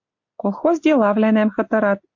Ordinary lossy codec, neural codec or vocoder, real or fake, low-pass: AAC, 48 kbps; none; real; 7.2 kHz